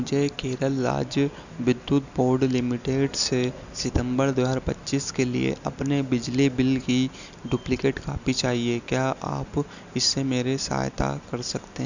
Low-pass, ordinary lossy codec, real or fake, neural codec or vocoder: 7.2 kHz; none; real; none